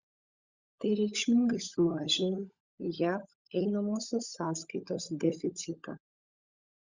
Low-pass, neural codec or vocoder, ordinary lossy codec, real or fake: 7.2 kHz; codec, 16 kHz, 16 kbps, FunCodec, trained on LibriTTS, 50 frames a second; Opus, 64 kbps; fake